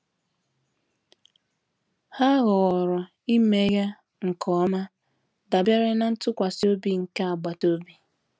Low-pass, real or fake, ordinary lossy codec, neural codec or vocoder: none; real; none; none